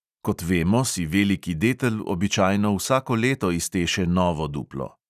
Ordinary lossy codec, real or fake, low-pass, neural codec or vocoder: none; real; 14.4 kHz; none